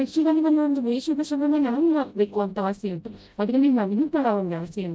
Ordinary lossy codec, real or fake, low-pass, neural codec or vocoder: none; fake; none; codec, 16 kHz, 0.5 kbps, FreqCodec, smaller model